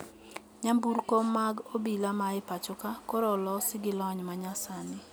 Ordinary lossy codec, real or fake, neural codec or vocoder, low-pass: none; real; none; none